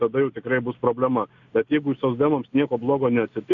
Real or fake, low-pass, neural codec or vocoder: real; 7.2 kHz; none